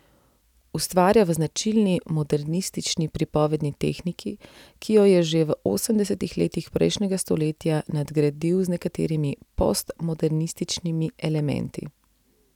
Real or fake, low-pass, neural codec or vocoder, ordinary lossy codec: real; 19.8 kHz; none; none